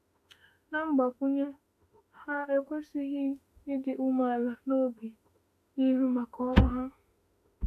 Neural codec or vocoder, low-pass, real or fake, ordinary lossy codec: autoencoder, 48 kHz, 32 numbers a frame, DAC-VAE, trained on Japanese speech; 14.4 kHz; fake; AAC, 64 kbps